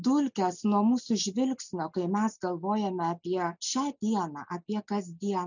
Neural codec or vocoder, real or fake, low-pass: none; real; 7.2 kHz